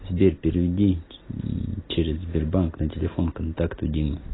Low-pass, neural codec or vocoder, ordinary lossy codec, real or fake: 7.2 kHz; none; AAC, 16 kbps; real